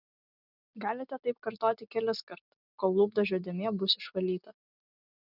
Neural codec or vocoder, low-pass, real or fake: none; 5.4 kHz; real